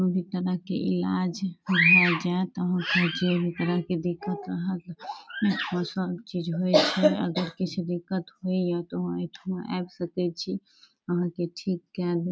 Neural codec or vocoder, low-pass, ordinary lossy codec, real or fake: none; none; none; real